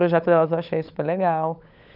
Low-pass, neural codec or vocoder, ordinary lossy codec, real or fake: 5.4 kHz; codec, 16 kHz, 16 kbps, FunCodec, trained on LibriTTS, 50 frames a second; none; fake